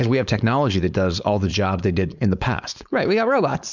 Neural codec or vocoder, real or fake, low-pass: codec, 16 kHz, 4.8 kbps, FACodec; fake; 7.2 kHz